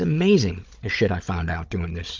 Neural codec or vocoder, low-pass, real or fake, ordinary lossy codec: codec, 16 kHz, 16 kbps, FunCodec, trained on Chinese and English, 50 frames a second; 7.2 kHz; fake; Opus, 24 kbps